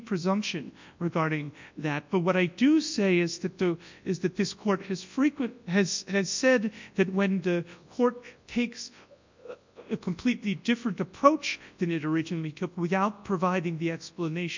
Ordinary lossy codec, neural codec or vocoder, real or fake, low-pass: MP3, 64 kbps; codec, 24 kHz, 0.9 kbps, WavTokenizer, large speech release; fake; 7.2 kHz